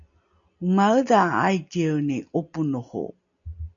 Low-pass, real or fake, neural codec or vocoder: 7.2 kHz; real; none